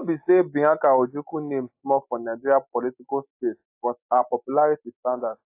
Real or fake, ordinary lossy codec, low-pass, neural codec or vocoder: real; AAC, 32 kbps; 3.6 kHz; none